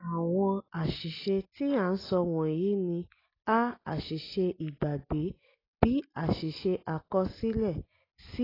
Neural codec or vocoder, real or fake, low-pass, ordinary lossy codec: none; real; 5.4 kHz; AAC, 24 kbps